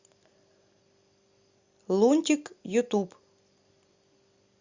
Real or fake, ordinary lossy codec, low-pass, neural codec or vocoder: real; Opus, 64 kbps; 7.2 kHz; none